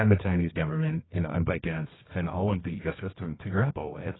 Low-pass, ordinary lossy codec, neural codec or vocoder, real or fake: 7.2 kHz; AAC, 16 kbps; codec, 24 kHz, 0.9 kbps, WavTokenizer, medium music audio release; fake